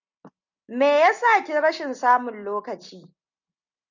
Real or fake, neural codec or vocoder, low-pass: real; none; 7.2 kHz